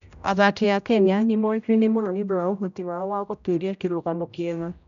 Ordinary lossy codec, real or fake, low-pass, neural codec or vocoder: none; fake; 7.2 kHz; codec, 16 kHz, 0.5 kbps, X-Codec, HuBERT features, trained on general audio